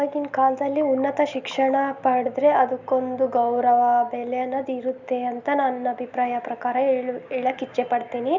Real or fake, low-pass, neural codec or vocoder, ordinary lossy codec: real; 7.2 kHz; none; none